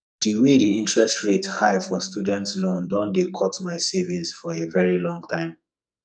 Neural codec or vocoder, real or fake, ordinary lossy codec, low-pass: codec, 44.1 kHz, 2.6 kbps, SNAC; fake; none; 9.9 kHz